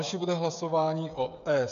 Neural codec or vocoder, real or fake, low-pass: codec, 16 kHz, 8 kbps, FreqCodec, smaller model; fake; 7.2 kHz